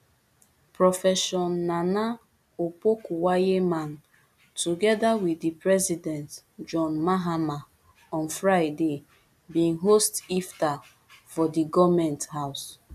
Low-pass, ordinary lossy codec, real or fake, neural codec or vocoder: 14.4 kHz; none; real; none